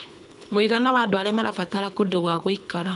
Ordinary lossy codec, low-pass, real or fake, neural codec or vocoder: none; 10.8 kHz; fake; codec, 24 kHz, 3 kbps, HILCodec